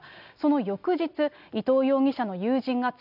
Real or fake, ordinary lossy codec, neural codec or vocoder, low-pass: real; none; none; 5.4 kHz